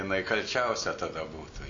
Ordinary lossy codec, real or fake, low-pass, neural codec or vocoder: MP3, 48 kbps; real; 7.2 kHz; none